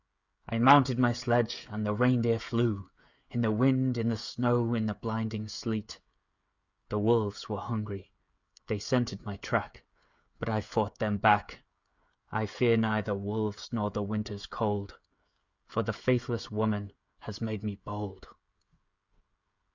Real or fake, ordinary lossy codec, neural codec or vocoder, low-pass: fake; Opus, 64 kbps; codec, 16 kHz, 16 kbps, FreqCodec, smaller model; 7.2 kHz